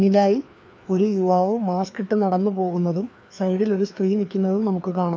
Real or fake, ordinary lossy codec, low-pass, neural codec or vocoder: fake; none; none; codec, 16 kHz, 2 kbps, FreqCodec, larger model